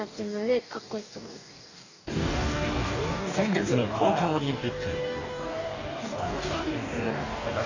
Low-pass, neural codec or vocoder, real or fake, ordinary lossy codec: 7.2 kHz; codec, 44.1 kHz, 2.6 kbps, DAC; fake; none